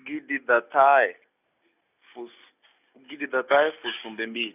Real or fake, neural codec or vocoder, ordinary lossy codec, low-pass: real; none; none; 3.6 kHz